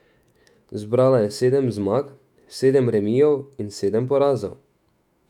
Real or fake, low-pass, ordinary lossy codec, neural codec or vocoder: fake; 19.8 kHz; none; autoencoder, 48 kHz, 128 numbers a frame, DAC-VAE, trained on Japanese speech